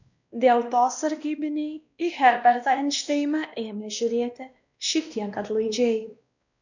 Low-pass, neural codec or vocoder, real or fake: 7.2 kHz; codec, 16 kHz, 1 kbps, X-Codec, WavLM features, trained on Multilingual LibriSpeech; fake